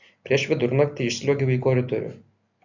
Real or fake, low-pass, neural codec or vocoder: real; 7.2 kHz; none